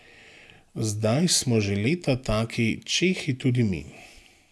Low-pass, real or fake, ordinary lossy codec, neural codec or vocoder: none; real; none; none